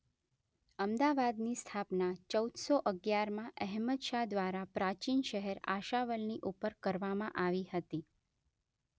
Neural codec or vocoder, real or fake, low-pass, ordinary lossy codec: none; real; none; none